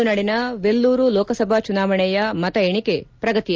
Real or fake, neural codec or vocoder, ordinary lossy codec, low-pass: real; none; Opus, 24 kbps; 7.2 kHz